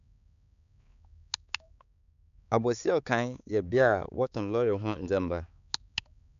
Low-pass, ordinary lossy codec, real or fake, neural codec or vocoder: 7.2 kHz; none; fake; codec, 16 kHz, 4 kbps, X-Codec, HuBERT features, trained on balanced general audio